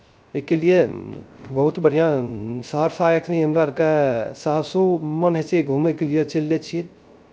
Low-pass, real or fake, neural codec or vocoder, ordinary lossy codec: none; fake; codec, 16 kHz, 0.3 kbps, FocalCodec; none